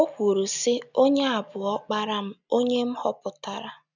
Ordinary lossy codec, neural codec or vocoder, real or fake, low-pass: none; none; real; 7.2 kHz